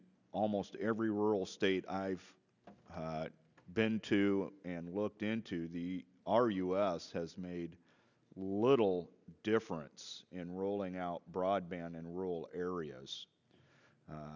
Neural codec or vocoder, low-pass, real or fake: none; 7.2 kHz; real